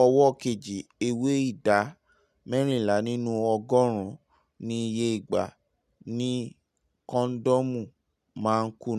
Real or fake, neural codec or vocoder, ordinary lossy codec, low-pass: real; none; none; 14.4 kHz